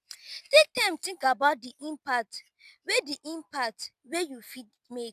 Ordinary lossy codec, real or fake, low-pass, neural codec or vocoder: none; real; 14.4 kHz; none